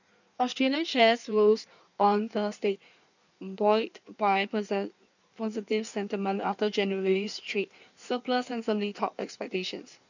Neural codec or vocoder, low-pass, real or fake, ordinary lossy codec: codec, 16 kHz in and 24 kHz out, 1.1 kbps, FireRedTTS-2 codec; 7.2 kHz; fake; none